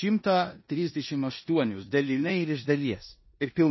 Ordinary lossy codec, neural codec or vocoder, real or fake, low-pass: MP3, 24 kbps; codec, 16 kHz in and 24 kHz out, 0.9 kbps, LongCat-Audio-Codec, four codebook decoder; fake; 7.2 kHz